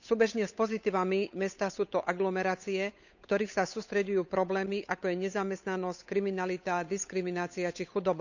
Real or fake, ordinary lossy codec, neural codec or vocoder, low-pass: fake; none; codec, 16 kHz, 8 kbps, FunCodec, trained on Chinese and English, 25 frames a second; 7.2 kHz